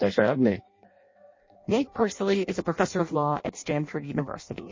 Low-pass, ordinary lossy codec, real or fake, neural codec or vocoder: 7.2 kHz; MP3, 32 kbps; fake; codec, 16 kHz in and 24 kHz out, 0.6 kbps, FireRedTTS-2 codec